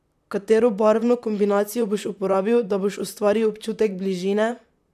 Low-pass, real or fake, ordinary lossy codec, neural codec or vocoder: 14.4 kHz; fake; none; vocoder, 44.1 kHz, 128 mel bands, Pupu-Vocoder